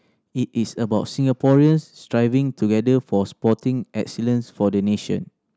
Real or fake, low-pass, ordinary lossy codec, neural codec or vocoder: real; none; none; none